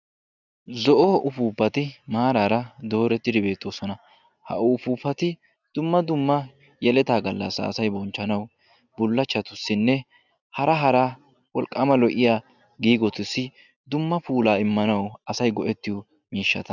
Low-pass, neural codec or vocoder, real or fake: 7.2 kHz; none; real